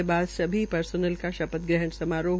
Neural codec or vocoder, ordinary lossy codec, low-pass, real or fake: none; none; none; real